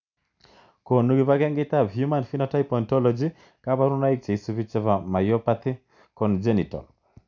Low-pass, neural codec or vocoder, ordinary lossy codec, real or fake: 7.2 kHz; none; none; real